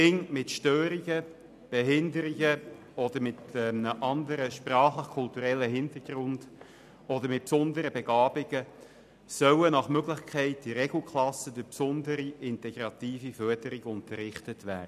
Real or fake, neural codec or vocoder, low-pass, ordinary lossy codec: real; none; 14.4 kHz; none